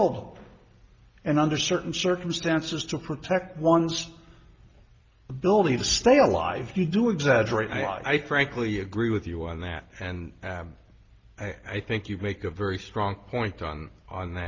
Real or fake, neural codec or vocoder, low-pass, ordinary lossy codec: real; none; 7.2 kHz; Opus, 24 kbps